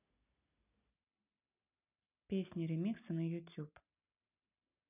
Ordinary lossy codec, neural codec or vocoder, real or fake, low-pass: none; none; real; 3.6 kHz